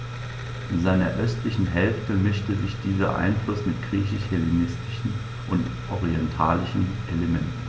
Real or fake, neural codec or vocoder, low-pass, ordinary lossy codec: real; none; none; none